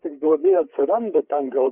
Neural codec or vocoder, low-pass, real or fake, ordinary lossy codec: codec, 16 kHz, 4 kbps, FreqCodec, larger model; 3.6 kHz; fake; Opus, 64 kbps